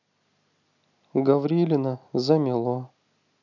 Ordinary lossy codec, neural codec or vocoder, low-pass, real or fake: none; none; 7.2 kHz; real